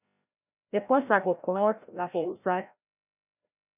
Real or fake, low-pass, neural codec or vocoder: fake; 3.6 kHz; codec, 16 kHz, 0.5 kbps, FreqCodec, larger model